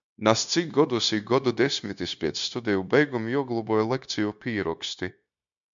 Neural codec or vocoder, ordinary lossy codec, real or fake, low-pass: codec, 16 kHz, 0.9 kbps, LongCat-Audio-Codec; MP3, 64 kbps; fake; 7.2 kHz